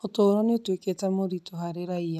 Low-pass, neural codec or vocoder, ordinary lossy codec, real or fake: 14.4 kHz; none; none; real